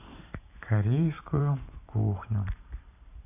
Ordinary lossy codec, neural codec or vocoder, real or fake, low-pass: none; vocoder, 44.1 kHz, 128 mel bands every 512 samples, BigVGAN v2; fake; 3.6 kHz